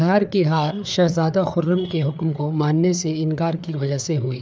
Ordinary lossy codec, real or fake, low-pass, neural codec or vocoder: none; fake; none; codec, 16 kHz, 4 kbps, FreqCodec, larger model